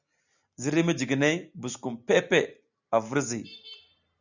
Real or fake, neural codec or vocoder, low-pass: real; none; 7.2 kHz